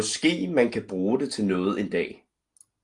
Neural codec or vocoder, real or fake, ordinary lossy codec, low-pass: none; real; Opus, 24 kbps; 10.8 kHz